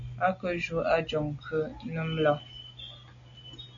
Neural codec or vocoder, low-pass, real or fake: none; 7.2 kHz; real